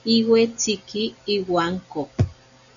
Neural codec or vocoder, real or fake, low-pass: none; real; 7.2 kHz